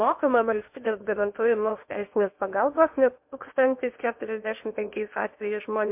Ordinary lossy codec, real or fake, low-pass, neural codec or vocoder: MP3, 32 kbps; fake; 3.6 kHz; codec, 16 kHz in and 24 kHz out, 0.8 kbps, FocalCodec, streaming, 65536 codes